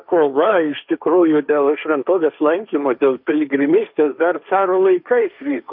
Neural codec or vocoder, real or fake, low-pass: codec, 16 kHz, 1.1 kbps, Voila-Tokenizer; fake; 5.4 kHz